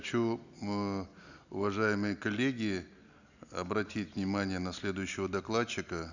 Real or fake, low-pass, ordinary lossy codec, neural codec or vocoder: real; 7.2 kHz; none; none